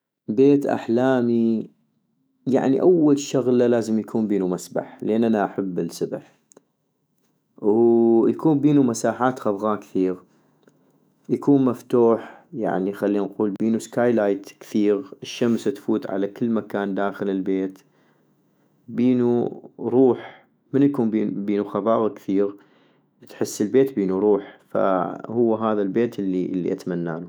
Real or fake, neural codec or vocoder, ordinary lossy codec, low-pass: fake; autoencoder, 48 kHz, 128 numbers a frame, DAC-VAE, trained on Japanese speech; none; none